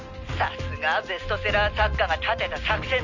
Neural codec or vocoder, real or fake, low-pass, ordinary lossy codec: none; real; 7.2 kHz; none